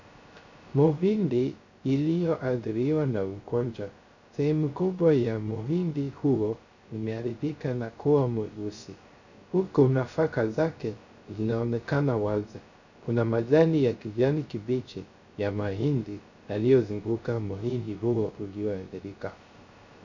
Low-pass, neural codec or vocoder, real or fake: 7.2 kHz; codec, 16 kHz, 0.3 kbps, FocalCodec; fake